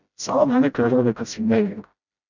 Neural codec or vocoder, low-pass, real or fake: codec, 16 kHz, 0.5 kbps, FreqCodec, smaller model; 7.2 kHz; fake